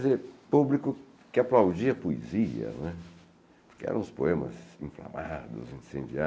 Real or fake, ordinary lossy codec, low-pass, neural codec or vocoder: real; none; none; none